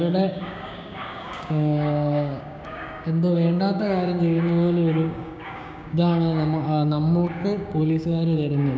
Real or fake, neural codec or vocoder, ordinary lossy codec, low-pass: fake; codec, 16 kHz, 6 kbps, DAC; none; none